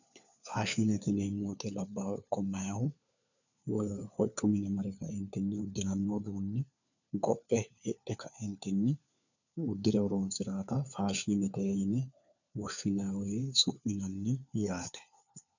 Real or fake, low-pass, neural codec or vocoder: fake; 7.2 kHz; codec, 16 kHz, 4 kbps, FunCodec, trained on LibriTTS, 50 frames a second